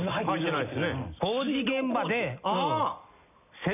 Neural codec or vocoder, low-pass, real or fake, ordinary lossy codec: none; 3.6 kHz; real; none